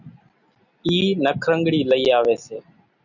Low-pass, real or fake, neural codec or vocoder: 7.2 kHz; real; none